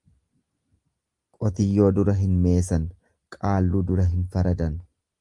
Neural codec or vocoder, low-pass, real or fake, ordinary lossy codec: none; 10.8 kHz; real; Opus, 32 kbps